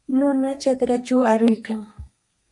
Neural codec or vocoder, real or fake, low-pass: codec, 32 kHz, 1.9 kbps, SNAC; fake; 10.8 kHz